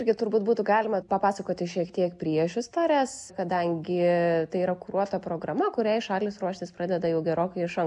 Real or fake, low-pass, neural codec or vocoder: real; 10.8 kHz; none